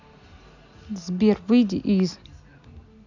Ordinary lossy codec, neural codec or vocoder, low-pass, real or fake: none; none; 7.2 kHz; real